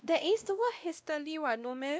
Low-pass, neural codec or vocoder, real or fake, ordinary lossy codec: none; codec, 16 kHz, 1 kbps, X-Codec, WavLM features, trained on Multilingual LibriSpeech; fake; none